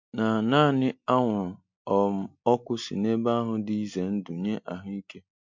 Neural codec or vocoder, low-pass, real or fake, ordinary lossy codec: none; 7.2 kHz; real; MP3, 48 kbps